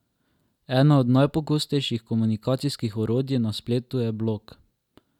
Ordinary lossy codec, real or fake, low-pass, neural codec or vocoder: none; real; 19.8 kHz; none